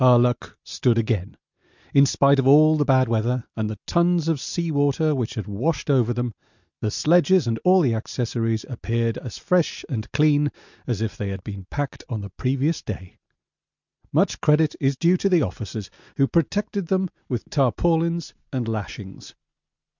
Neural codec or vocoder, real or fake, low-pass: none; real; 7.2 kHz